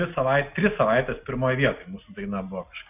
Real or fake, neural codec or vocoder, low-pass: real; none; 3.6 kHz